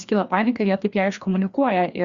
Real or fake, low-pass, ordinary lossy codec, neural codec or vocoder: fake; 7.2 kHz; Opus, 64 kbps; codec, 16 kHz, 2 kbps, FreqCodec, larger model